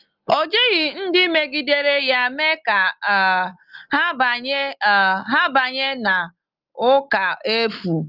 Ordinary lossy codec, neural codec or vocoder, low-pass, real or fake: Opus, 24 kbps; none; 5.4 kHz; real